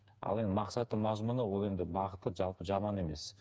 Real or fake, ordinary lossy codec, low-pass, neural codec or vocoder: fake; none; none; codec, 16 kHz, 4 kbps, FreqCodec, smaller model